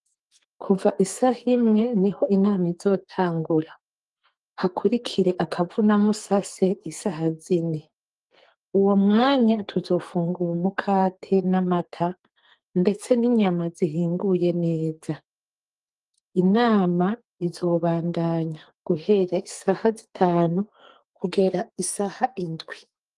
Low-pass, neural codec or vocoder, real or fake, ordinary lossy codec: 10.8 kHz; codec, 44.1 kHz, 2.6 kbps, SNAC; fake; Opus, 24 kbps